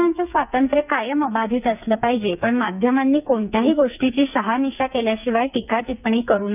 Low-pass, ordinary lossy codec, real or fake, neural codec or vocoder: 3.6 kHz; none; fake; codec, 44.1 kHz, 2.6 kbps, SNAC